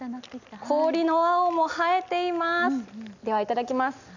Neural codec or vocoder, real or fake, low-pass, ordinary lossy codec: none; real; 7.2 kHz; none